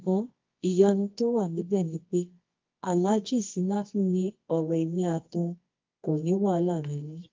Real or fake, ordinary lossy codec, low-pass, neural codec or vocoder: fake; Opus, 24 kbps; 7.2 kHz; codec, 24 kHz, 0.9 kbps, WavTokenizer, medium music audio release